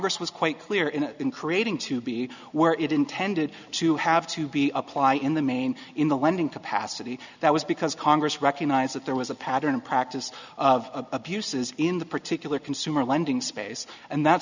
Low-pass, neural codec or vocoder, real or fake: 7.2 kHz; none; real